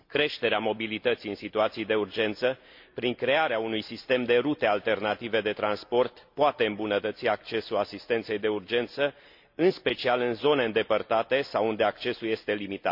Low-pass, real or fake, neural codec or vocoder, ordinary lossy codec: 5.4 kHz; real; none; MP3, 48 kbps